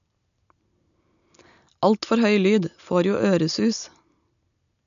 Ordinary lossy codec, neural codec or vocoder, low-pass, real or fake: none; none; 7.2 kHz; real